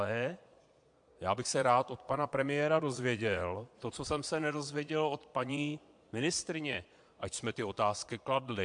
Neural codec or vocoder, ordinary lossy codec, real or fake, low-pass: vocoder, 22.05 kHz, 80 mel bands, Vocos; MP3, 64 kbps; fake; 9.9 kHz